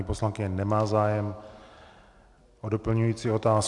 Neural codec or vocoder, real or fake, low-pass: none; real; 10.8 kHz